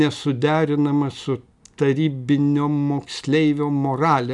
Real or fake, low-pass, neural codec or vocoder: real; 10.8 kHz; none